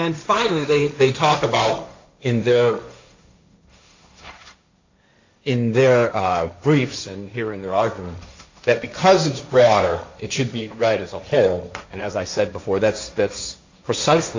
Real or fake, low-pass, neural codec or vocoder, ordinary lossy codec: fake; 7.2 kHz; codec, 16 kHz, 1.1 kbps, Voila-Tokenizer; AAC, 48 kbps